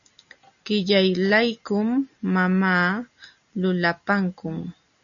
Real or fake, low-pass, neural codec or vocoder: real; 7.2 kHz; none